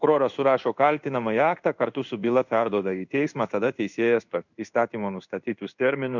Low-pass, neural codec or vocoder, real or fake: 7.2 kHz; codec, 16 kHz in and 24 kHz out, 1 kbps, XY-Tokenizer; fake